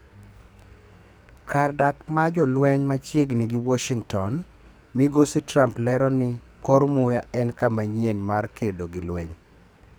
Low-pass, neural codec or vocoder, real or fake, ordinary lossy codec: none; codec, 44.1 kHz, 2.6 kbps, SNAC; fake; none